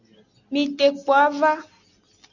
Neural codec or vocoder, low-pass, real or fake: none; 7.2 kHz; real